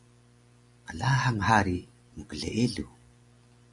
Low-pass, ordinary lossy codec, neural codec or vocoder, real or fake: 10.8 kHz; MP3, 96 kbps; none; real